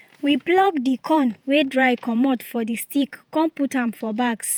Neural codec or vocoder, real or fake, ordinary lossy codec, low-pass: vocoder, 48 kHz, 128 mel bands, Vocos; fake; none; none